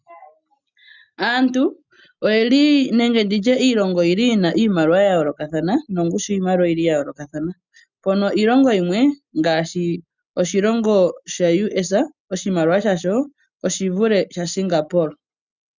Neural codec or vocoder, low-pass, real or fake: none; 7.2 kHz; real